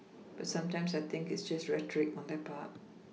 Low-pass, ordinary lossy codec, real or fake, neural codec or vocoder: none; none; real; none